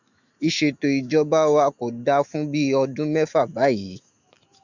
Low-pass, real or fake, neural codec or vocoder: 7.2 kHz; fake; autoencoder, 48 kHz, 128 numbers a frame, DAC-VAE, trained on Japanese speech